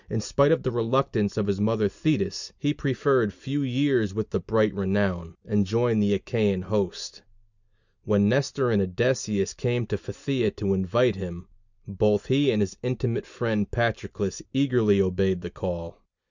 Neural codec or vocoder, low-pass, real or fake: none; 7.2 kHz; real